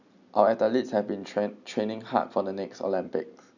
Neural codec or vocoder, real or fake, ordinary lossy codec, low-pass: none; real; none; 7.2 kHz